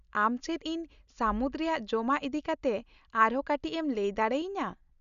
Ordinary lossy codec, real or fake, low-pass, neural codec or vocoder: none; real; 7.2 kHz; none